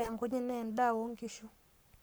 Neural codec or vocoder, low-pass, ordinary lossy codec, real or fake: codec, 44.1 kHz, 7.8 kbps, Pupu-Codec; none; none; fake